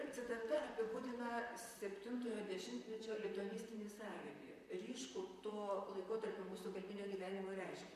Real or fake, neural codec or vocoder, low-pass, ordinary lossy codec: fake; vocoder, 44.1 kHz, 128 mel bands, Pupu-Vocoder; 14.4 kHz; MP3, 64 kbps